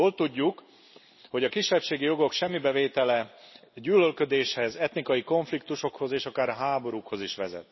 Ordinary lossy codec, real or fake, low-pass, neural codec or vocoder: MP3, 24 kbps; real; 7.2 kHz; none